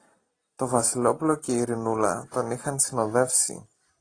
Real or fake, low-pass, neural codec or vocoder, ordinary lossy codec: real; 9.9 kHz; none; AAC, 32 kbps